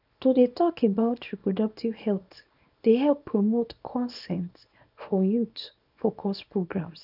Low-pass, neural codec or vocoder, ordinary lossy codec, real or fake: 5.4 kHz; codec, 24 kHz, 0.9 kbps, WavTokenizer, small release; none; fake